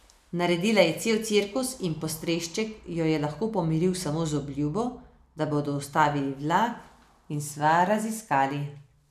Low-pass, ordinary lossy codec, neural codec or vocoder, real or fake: 14.4 kHz; none; none; real